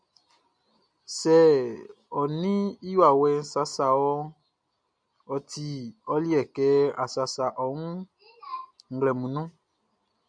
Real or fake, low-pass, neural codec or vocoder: real; 9.9 kHz; none